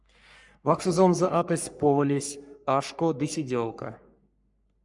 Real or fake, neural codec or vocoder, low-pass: fake; codec, 44.1 kHz, 3.4 kbps, Pupu-Codec; 10.8 kHz